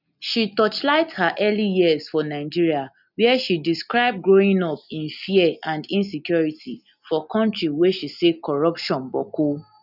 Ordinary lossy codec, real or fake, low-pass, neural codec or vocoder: none; real; 5.4 kHz; none